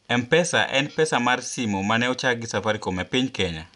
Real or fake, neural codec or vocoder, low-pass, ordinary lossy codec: real; none; 10.8 kHz; none